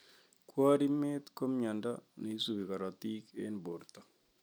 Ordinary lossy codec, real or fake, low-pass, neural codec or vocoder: none; real; none; none